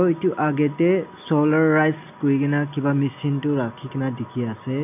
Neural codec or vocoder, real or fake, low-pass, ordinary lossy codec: none; real; 3.6 kHz; none